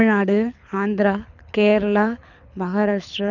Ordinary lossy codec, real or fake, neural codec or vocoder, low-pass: none; fake; codec, 24 kHz, 6 kbps, HILCodec; 7.2 kHz